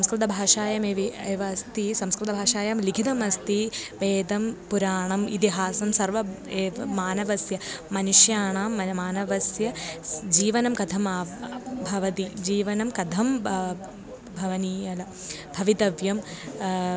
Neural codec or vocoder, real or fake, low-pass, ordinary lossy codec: none; real; none; none